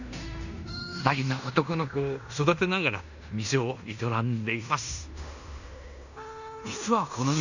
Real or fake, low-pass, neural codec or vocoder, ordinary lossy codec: fake; 7.2 kHz; codec, 16 kHz in and 24 kHz out, 0.9 kbps, LongCat-Audio-Codec, fine tuned four codebook decoder; none